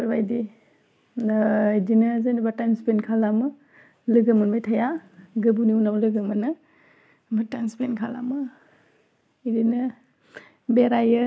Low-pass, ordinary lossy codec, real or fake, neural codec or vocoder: none; none; real; none